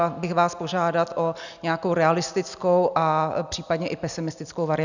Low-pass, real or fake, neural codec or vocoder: 7.2 kHz; real; none